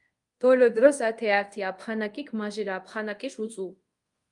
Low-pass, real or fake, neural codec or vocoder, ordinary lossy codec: 10.8 kHz; fake; codec, 24 kHz, 0.5 kbps, DualCodec; Opus, 32 kbps